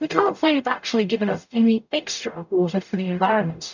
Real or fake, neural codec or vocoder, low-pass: fake; codec, 44.1 kHz, 0.9 kbps, DAC; 7.2 kHz